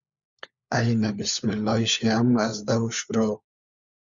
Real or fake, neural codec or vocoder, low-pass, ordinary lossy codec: fake; codec, 16 kHz, 4 kbps, FunCodec, trained on LibriTTS, 50 frames a second; 7.2 kHz; Opus, 64 kbps